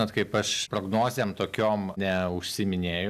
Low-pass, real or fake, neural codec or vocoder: 14.4 kHz; real; none